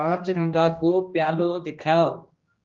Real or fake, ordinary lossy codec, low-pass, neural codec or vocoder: fake; Opus, 32 kbps; 7.2 kHz; codec, 16 kHz, 1 kbps, X-Codec, HuBERT features, trained on general audio